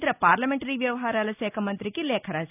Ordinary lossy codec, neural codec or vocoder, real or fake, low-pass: none; none; real; 3.6 kHz